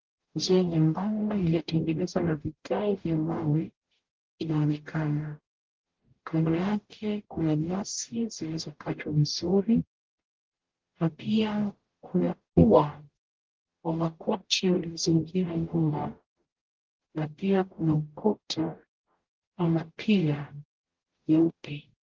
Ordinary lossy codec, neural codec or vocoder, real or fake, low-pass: Opus, 16 kbps; codec, 44.1 kHz, 0.9 kbps, DAC; fake; 7.2 kHz